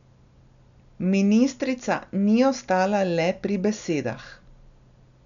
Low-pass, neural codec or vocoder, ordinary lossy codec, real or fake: 7.2 kHz; none; none; real